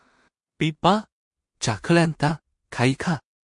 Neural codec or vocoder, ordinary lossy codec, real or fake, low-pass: codec, 16 kHz in and 24 kHz out, 0.4 kbps, LongCat-Audio-Codec, two codebook decoder; MP3, 48 kbps; fake; 10.8 kHz